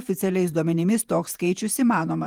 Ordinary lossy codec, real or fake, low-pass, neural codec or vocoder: Opus, 24 kbps; fake; 19.8 kHz; vocoder, 48 kHz, 128 mel bands, Vocos